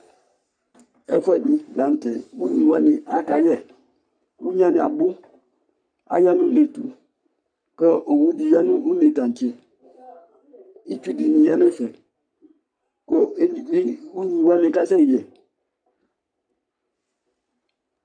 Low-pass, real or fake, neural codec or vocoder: 9.9 kHz; fake; codec, 44.1 kHz, 3.4 kbps, Pupu-Codec